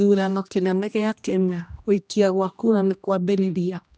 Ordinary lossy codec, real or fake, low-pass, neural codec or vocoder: none; fake; none; codec, 16 kHz, 1 kbps, X-Codec, HuBERT features, trained on general audio